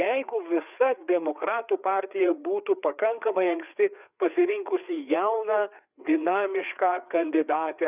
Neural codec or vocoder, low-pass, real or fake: codec, 16 kHz, 4 kbps, FreqCodec, larger model; 3.6 kHz; fake